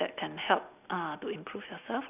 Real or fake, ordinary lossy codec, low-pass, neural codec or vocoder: real; none; 3.6 kHz; none